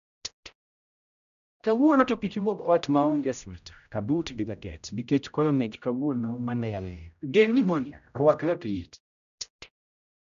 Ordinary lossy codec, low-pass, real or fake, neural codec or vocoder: none; 7.2 kHz; fake; codec, 16 kHz, 0.5 kbps, X-Codec, HuBERT features, trained on general audio